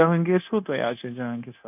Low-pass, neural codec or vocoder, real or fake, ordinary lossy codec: 3.6 kHz; codec, 16 kHz, 0.9 kbps, LongCat-Audio-Codec; fake; none